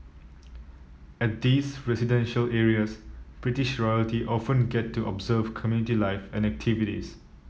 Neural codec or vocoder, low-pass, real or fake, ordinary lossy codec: none; none; real; none